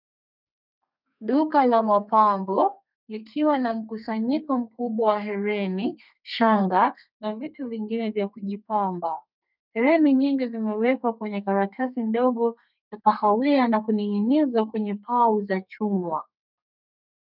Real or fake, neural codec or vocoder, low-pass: fake; codec, 32 kHz, 1.9 kbps, SNAC; 5.4 kHz